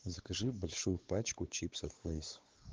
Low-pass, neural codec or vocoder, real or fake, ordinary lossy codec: 7.2 kHz; codec, 16 kHz, 4 kbps, X-Codec, WavLM features, trained on Multilingual LibriSpeech; fake; Opus, 16 kbps